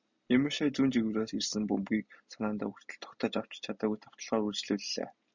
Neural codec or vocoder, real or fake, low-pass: none; real; 7.2 kHz